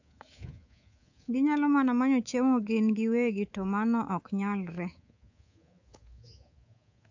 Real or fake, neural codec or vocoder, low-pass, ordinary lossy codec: fake; codec, 24 kHz, 3.1 kbps, DualCodec; 7.2 kHz; AAC, 48 kbps